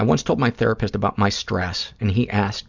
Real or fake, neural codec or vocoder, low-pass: real; none; 7.2 kHz